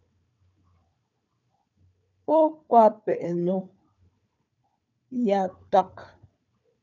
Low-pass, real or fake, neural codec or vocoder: 7.2 kHz; fake; codec, 16 kHz, 4 kbps, FunCodec, trained on Chinese and English, 50 frames a second